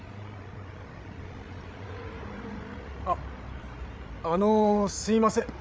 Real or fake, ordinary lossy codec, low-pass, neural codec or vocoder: fake; none; none; codec, 16 kHz, 16 kbps, FreqCodec, larger model